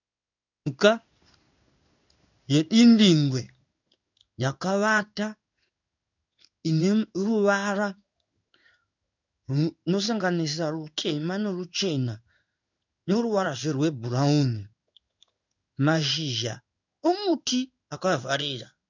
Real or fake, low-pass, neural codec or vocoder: fake; 7.2 kHz; codec, 16 kHz in and 24 kHz out, 1 kbps, XY-Tokenizer